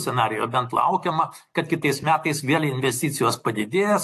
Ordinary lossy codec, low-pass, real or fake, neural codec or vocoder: AAC, 64 kbps; 14.4 kHz; real; none